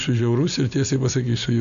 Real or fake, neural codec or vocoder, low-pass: real; none; 7.2 kHz